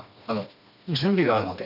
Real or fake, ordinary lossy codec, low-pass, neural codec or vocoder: fake; none; 5.4 kHz; codec, 16 kHz, 2 kbps, FreqCodec, smaller model